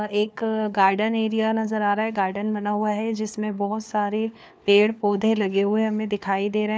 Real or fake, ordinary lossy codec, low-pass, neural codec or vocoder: fake; none; none; codec, 16 kHz, 4 kbps, FunCodec, trained on LibriTTS, 50 frames a second